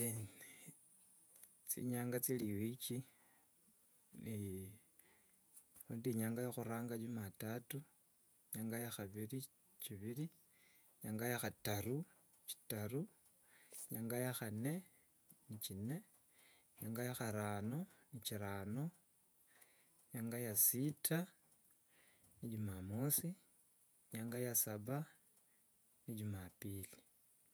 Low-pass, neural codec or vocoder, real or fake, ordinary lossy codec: none; vocoder, 48 kHz, 128 mel bands, Vocos; fake; none